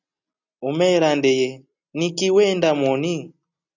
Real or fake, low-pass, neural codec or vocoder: real; 7.2 kHz; none